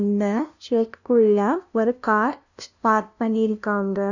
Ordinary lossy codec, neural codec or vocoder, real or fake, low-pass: none; codec, 16 kHz, 0.5 kbps, FunCodec, trained on LibriTTS, 25 frames a second; fake; 7.2 kHz